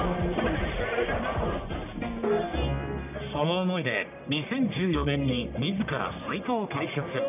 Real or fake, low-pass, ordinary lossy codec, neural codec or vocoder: fake; 3.6 kHz; none; codec, 44.1 kHz, 1.7 kbps, Pupu-Codec